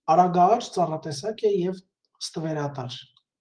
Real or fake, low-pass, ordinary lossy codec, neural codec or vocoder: fake; 9.9 kHz; Opus, 24 kbps; autoencoder, 48 kHz, 128 numbers a frame, DAC-VAE, trained on Japanese speech